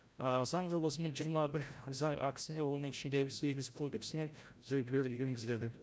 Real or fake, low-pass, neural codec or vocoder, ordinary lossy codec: fake; none; codec, 16 kHz, 0.5 kbps, FreqCodec, larger model; none